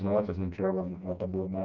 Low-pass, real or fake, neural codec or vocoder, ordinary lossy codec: 7.2 kHz; fake; codec, 16 kHz, 1 kbps, FreqCodec, smaller model; none